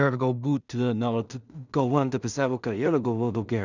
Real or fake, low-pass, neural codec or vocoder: fake; 7.2 kHz; codec, 16 kHz in and 24 kHz out, 0.4 kbps, LongCat-Audio-Codec, two codebook decoder